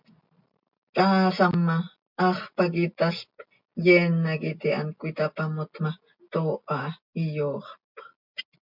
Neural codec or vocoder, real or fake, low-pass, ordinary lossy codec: none; real; 5.4 kHz; MP3, 48 kbps